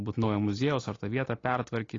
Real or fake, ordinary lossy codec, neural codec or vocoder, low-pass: real; AAC, 32 kbps; none; 7.2 kHz